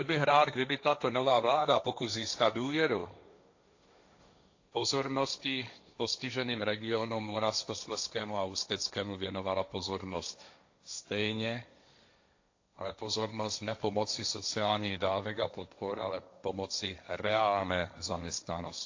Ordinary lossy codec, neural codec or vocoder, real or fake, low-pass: AAC, 48 kbps; codec, 16 kHz, 1.1 kbps, Voila-Tokenizer; fake; 7.2 kHz